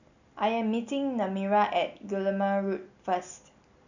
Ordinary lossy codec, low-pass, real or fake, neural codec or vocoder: none; 7.2 kHz; real; none